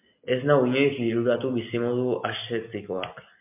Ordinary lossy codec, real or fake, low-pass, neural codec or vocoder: MP3, 32 kbps; real; 3.6 kHz; none